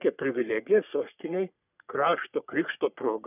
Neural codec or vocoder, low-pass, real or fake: codec, 44.1 kHz, 3.4 kbps, Pupu-Codec; 3.6 kHz; fake